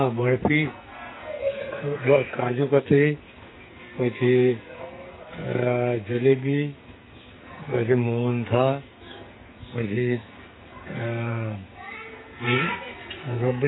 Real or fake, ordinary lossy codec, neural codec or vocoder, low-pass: fake; AAC, 16 kbps; codec, 44.1 kHz, 2.6 kbps, SNAC; 7.2 kHz